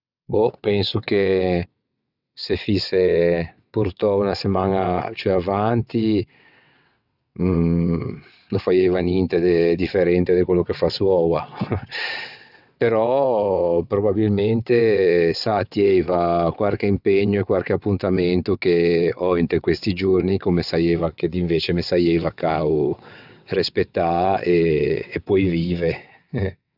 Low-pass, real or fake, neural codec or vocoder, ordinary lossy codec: 5.4 kHz; fake; vocoder, 22.05 kHz, 80 mel bands, WaveNeXt; none